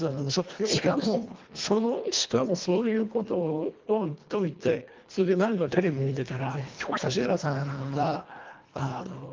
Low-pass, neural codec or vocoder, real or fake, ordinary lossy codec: 7.2 kHz; codec, 24 kHz, 1.5 kbps, HILCodec; fake; Opus, 32 kbps